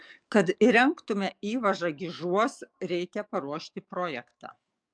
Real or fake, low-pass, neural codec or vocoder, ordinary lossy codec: fake; 9.9 kHz; codec, 44.1 kHz, 7.8 kbps, DAC; MP3, 96 kbps